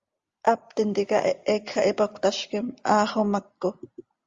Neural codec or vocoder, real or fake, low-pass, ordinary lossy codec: none; real; 7.2 kHz; Opus, 32 kbps